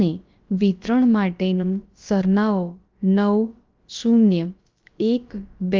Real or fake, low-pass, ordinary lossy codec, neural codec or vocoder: fake; 7.2 kHz; Opus, 32 kbps; codec, 16 kHz, about 1 kbps, DyCAST, with the encoder's durations